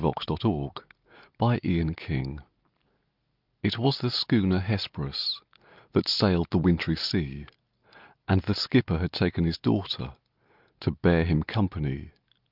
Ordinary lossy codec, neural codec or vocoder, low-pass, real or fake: Opus, 24 kbps; none; 5.4 kHz; real